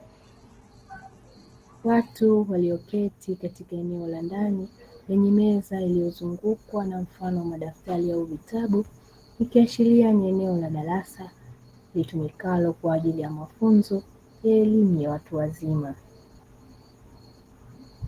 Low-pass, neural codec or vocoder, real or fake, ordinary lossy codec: 14.4 kHz; none; real; Opus, 16 kbps